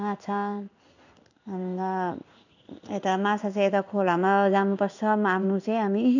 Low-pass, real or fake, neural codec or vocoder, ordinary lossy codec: 7.2 kHz; fake; codec, 16 kHz in and 24 kHz out, 1 kbps, XY-Tokenizer; none